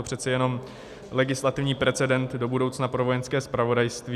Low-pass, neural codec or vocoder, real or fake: 14.4 kHz; none; real